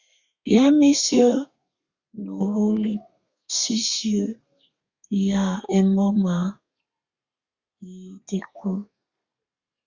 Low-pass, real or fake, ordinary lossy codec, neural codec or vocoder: 7.2 kHz; fake; Opus, 64 kbps; codec, 32 kHz, 1.9 kbps, SNAC